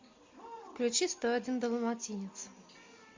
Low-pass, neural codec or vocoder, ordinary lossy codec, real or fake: 7.2 kHz; none; MP3, 48 kbps; real